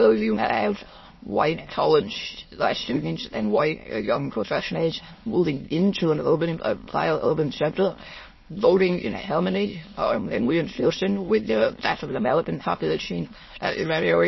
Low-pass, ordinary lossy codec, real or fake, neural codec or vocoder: 7.2 kHz; MP3, 24 kbps; fake; autoencoder, 22.05 kHz, a latent of 192 numbers a frame, VITS, trained on many speakers